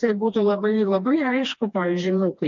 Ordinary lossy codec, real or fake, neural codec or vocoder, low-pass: MP3, 48 kbps; fake; codec, 16 kHz, 2 kbps, FreqCodec, smaller model; 7.2 kHz